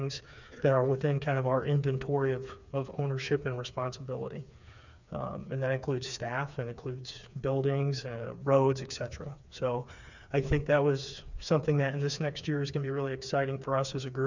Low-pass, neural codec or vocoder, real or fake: 7.2 kHz; codec, 16 kHz, 4 kbps, FreqCodec, smaller model; fake